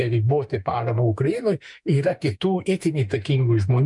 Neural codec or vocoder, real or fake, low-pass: autoencoder, 48 kHz, 32 numbers a frame, DAC-VAE, trained on Japanese speech; fake; 10.8 kHz